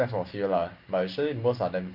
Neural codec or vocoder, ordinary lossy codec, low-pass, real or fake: none; Opus, 32 kbps; 5.4 kHz; real